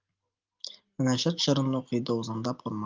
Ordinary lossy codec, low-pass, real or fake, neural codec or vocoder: Opus, 32 kbps; 7.2 kHz; real; none